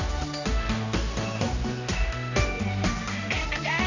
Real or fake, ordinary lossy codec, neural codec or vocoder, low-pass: fake; none; codec, 16 kHz, 1 kbps, X-Codec, HuBERT features, trained on general audio; 7.2 kHz